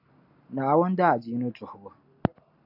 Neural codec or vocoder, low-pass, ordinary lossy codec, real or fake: none; 5.4 kHz; AAC, 48 kbps; real